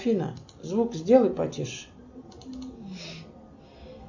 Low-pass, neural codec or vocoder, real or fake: 7.2 kHz; none; real